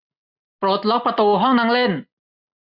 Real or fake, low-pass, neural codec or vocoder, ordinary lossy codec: real; 5.4 kHz; none; none